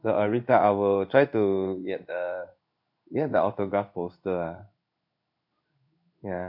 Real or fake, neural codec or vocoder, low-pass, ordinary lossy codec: fake; codec, 16 kHz in and 24 kHz out, 1 kbps, XY-Tokenizer; 5.4 kHz; none